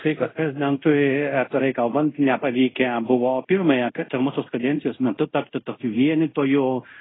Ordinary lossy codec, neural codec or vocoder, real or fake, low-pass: AAC, 16 kbps; codec, 24 kHz, 0.5 kbps, DualCodec; fake; 7.2 kHz